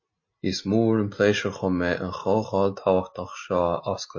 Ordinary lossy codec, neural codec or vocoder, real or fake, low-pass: MP3, 32 kbps; none; real; 7.2 kHz